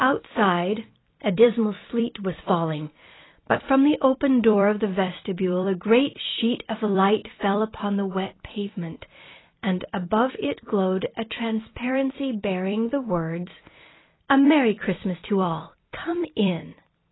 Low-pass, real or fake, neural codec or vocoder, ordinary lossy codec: 7.2 kHz; fake; vocoder, 44.1 kHz, 128 mel bands every 256 samples, BigVGAN v2; AAC, 16 kbps